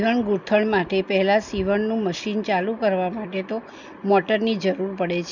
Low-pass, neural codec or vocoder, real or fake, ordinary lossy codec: 7.2 kHz; none; real; none